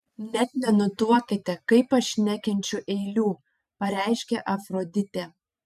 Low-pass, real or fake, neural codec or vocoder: 14.4 kHz; fake; vocoder, 44.1 kHz, 128 mel bands every 512 samples, BigVGAN v2